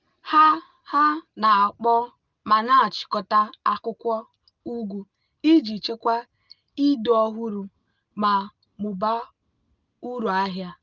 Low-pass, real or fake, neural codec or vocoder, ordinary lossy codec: 7.2 kHz; real; none; Opus, 32 kbps